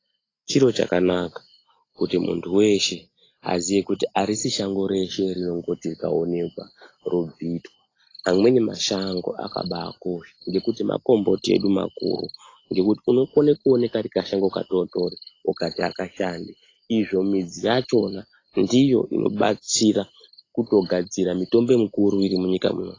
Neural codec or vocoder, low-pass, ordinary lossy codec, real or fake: none; 7.2 kHz; AAC, 32 kbps; real